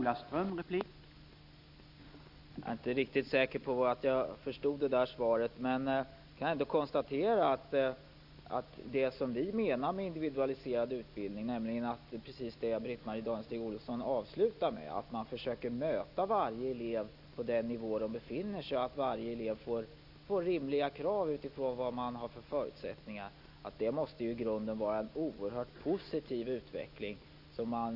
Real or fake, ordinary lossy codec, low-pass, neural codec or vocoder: real; none; 5.4 kHz; none